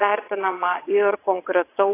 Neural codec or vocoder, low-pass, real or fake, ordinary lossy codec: vocoder, 22.05 kHz, 80 mel bands, WaveNeXt; 3.6 kHz; fake; AAC, 24 kbps